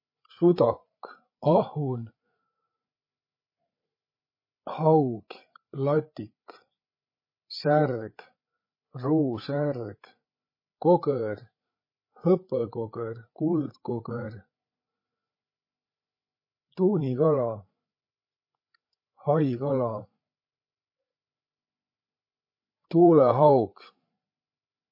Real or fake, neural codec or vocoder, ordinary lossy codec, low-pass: fake; codec, 16 kHz, 8 kbps, FreqCodec, larger model; MP3, 24 kbps; 5.4 kHz